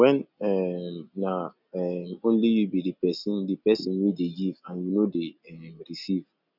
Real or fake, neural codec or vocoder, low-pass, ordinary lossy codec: real; none; 5.4 kHz; none